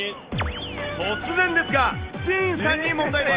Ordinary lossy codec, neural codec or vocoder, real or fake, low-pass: Opus, 32 kbps; none; real; 3.6 kHz